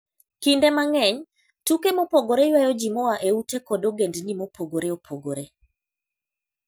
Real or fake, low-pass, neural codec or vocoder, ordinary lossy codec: real; none; none; none